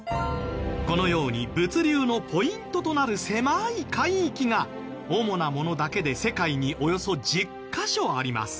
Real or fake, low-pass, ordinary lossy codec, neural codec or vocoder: real; none; none; none